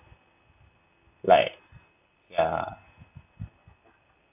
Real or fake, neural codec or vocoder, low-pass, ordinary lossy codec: real; none; 3.6 kHz; AAC, 32 kbps